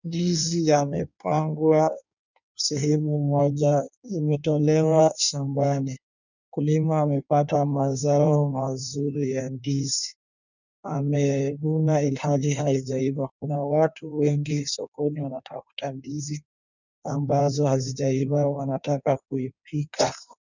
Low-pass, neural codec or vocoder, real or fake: 7.2 kHz; codec, 16 kHz in and 24 kHz out, 1.1 kbps, FireRedTTS-2 codec; fake